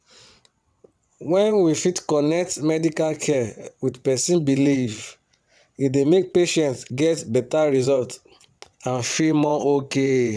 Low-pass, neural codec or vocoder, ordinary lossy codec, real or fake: none; vocoder, 22.05 kHz, 80 mel bands, WaveNeXt; none; fake